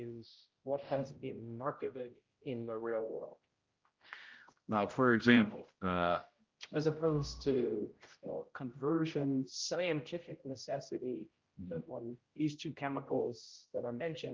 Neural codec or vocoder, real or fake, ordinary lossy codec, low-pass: codec, 16 kHz, 0.5 kbps, X-Codec, HuBERT features, trained on general audio; fake; Opus, 32 kbps; 7.2 kHz